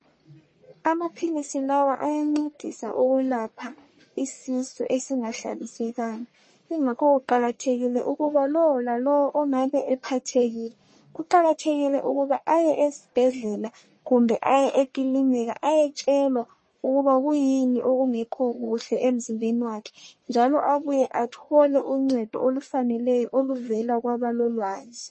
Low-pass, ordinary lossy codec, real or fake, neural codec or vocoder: 9.9 kHz; MP3, 32 kbps; fake; codec, 44.1 kHz, 1.7 kbps, Pupu-Codec